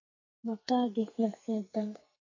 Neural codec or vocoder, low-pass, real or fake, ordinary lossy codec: codec, 44.1 kHz, 2.6 kbps, SNAC; 7.2 kHz; fake; MP3, 32 kbps